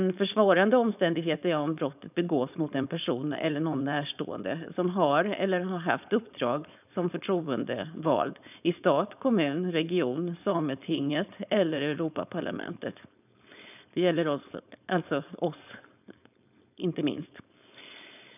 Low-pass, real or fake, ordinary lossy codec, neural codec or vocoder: 3.6 kHz; fake; none; codec, 16 kHz, 4.8 kbps, FACodec